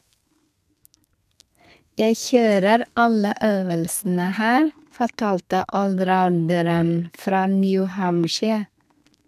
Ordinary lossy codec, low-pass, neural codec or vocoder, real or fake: none; 14.4 kHz; codec, 32 kHz, 1.9 kbps, SNAC; fake